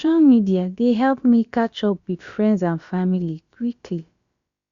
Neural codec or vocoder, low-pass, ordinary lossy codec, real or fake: codec, 16 kHz, about 1 kbps, DyCAST, with the encoder's durations; 7.2 kHz; Opus, 64 kbps; fake